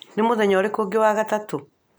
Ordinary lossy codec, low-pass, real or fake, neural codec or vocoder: none; none; real; none